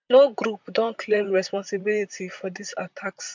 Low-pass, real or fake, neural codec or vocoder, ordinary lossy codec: 7.2 kHz; fake; vocoder, 44.1 kHz, 128 mel bands, Pupu-Vocoder; MP3, 64 kbps